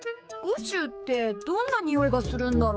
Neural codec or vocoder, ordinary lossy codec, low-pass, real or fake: codec, 16 kHz, 4 kbps, X-Codec, HuBERT features, trained on general audio; none; none; fake